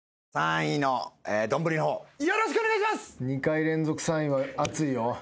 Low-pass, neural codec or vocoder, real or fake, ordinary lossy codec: none; none; real; none